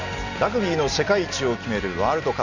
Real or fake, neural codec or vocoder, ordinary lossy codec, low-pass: real; none; none; 7.2 kHz